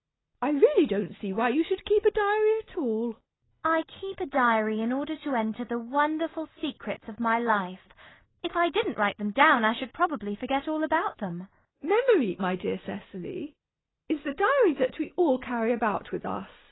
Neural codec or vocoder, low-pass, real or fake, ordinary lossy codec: none; 7.2 kHz; real; AAC, 16 kbps